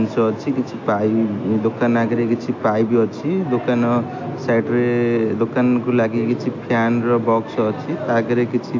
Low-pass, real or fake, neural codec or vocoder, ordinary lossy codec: 7.2 kHz; real; none; AAC, 48 kbps